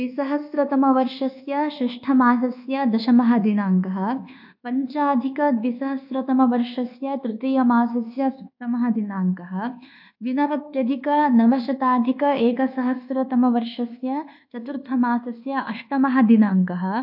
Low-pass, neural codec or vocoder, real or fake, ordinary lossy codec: 5.4 kHz; codec, 24 kHz, 1.2 kbps, DualCodec; fake; AAC, 48 kbps